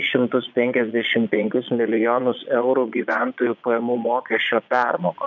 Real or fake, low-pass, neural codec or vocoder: fake; 7.2 kHz; vocoder, 22.05 kHz, 80 mel bands, Vocos